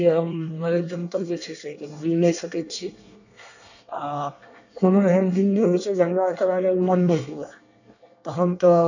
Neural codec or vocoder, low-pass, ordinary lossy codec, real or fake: codec, 24 kHz, 1 kbps, SNAC; 7.2 kHz; none; fake